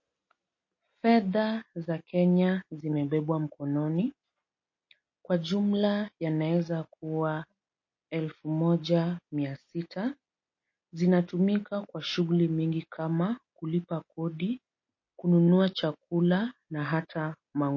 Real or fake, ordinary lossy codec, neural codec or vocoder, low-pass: real; MP3, 32 kbps; none; 7.2 kHz